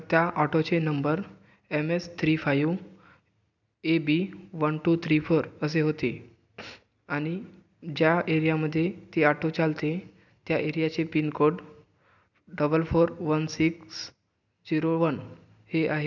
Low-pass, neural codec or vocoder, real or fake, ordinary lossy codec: 7.2 kHz; none; real; none